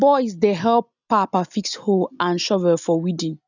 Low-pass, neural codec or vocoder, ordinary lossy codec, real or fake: 7.2 kHz; none; none; real